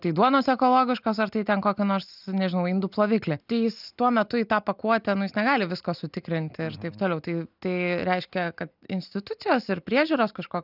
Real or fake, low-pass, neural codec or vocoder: real; 5.4 kHz; none